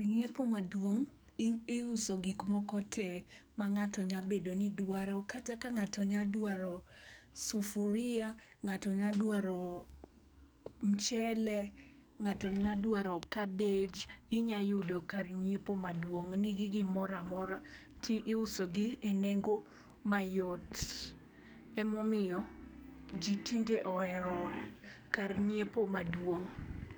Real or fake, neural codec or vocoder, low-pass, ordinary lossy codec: fake; codec, 44.1 kHz, 2.6 kbps, SNAC; none; none